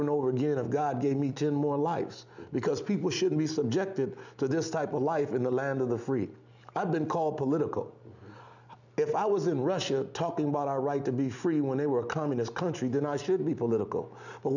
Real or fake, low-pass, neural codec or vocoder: real; 7.2 kHz; none